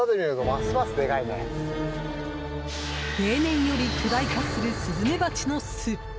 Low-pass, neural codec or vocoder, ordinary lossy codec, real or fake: none; none; none; real